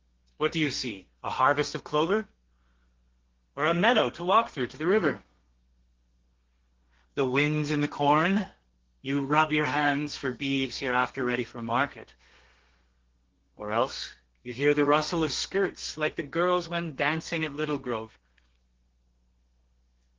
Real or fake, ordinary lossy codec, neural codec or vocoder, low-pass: fake; Opus, 16 kbps; codec, 32 kHz, 1.9 kbps, SNAC; 7.2 kHz